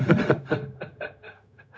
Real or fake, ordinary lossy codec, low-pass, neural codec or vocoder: fake; none; none; codec, 16 kHz, 0.4 kbps, LongCat-Audio-Codec